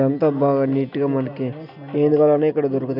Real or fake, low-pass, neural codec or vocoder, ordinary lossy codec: real; 5.4 kHz; none; none